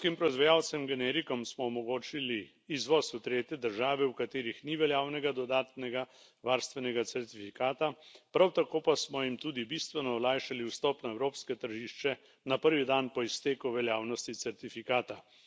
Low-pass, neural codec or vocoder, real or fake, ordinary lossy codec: none; none; real; none